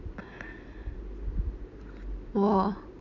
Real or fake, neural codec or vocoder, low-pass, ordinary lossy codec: real; none; 7.2 kHz; none